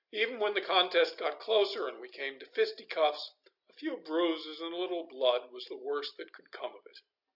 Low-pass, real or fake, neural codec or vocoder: 5.4 kHz; real; none